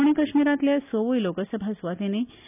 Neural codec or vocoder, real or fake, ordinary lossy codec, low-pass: none; real; none; 3.6 kHz